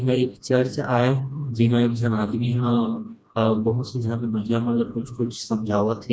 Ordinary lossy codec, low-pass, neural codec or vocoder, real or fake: none; none; codec, 16 kHz, 1 kbps, FreqCodec, smaller model; fake